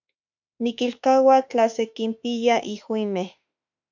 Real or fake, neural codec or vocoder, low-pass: fake; autoencoder, 48 kHz, 32 numbers a frame, DAC-VAE, trained on Japanese speech; 7.2 kHz